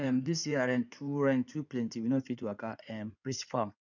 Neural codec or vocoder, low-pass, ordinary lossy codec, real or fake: codec, 16 kHz in and 24 kHz out, 2.2 kbps, FireRedTTS-2 codec; 7.2 kHz; none; fake